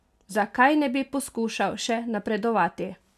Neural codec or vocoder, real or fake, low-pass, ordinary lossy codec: none; real; 14.4 kHz; none